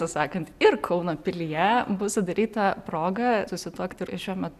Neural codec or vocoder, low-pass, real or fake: none; 14.4 kHz; real